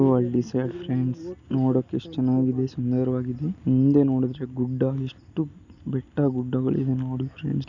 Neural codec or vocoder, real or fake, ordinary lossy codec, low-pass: none; real; none; 7.2 kHz